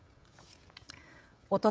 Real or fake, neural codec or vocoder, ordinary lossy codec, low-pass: fake; codec, 16 kHz, 16 kbps, FreqCodec, smaller model; none; none